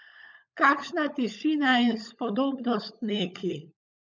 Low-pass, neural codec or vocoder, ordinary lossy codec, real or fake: 7.2 kHz; codec, 16 kHz, 16 kbps, FunCodec, trained on LibriTTS, 50 frames a second; none; fake